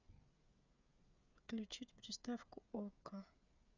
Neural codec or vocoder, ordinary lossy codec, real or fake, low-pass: codec, 16 kHz, 8 kbps, FreqCodec, smaller model; none; fake; 7.2 kHz